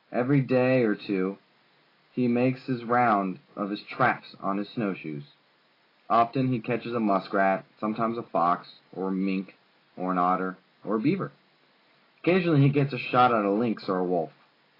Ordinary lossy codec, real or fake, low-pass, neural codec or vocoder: AAC, 24 kbps; real; 5.4 kHz; none